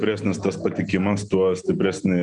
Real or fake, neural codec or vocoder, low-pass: real; none; 9.9 kHz